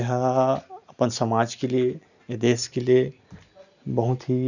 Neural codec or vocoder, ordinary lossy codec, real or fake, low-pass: none; none; real; 7.2 kHz